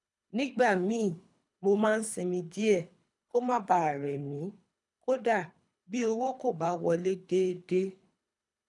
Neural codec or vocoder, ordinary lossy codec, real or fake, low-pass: codec, 24 kHz, 3 kbps, HILCodec; none; fake; 10.8 kHz